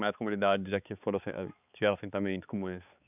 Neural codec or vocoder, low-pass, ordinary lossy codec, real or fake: codec, 16 kHz, 4 kbps, X-Codec, HuBERT features, trained on balanced general audio; 3.6 kHz; none; fake